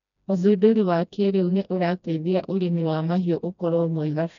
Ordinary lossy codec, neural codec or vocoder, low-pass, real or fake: none; codec, 16 kHz, 1 kbps, FreqCodec, smaller model; 7.2 kHz; fake